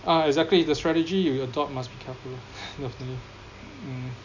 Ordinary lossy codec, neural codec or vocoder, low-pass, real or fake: none; none; 7.2 kHz; real